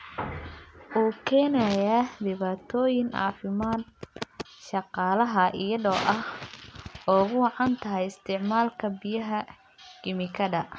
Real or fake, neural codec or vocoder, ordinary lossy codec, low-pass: real; none; none; none